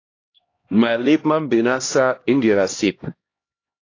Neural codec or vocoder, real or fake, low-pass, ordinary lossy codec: codec, 16 kHz, 1 kbps, X-Codec, HuBERT features, trained on LibriSpeech; fake; 7.2 kHz; AAC, 32 kbps